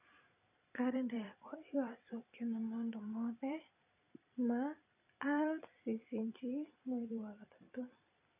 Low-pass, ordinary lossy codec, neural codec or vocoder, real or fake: 3.6 kHz; none; vocoder, 44.1 kHz, 128 mel bands every 256 samples, BigVGAN v2; fake